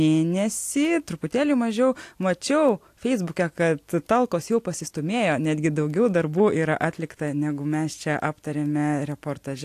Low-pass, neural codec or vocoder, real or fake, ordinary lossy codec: 14.4 kHz; none; real; AAC, 64 kbps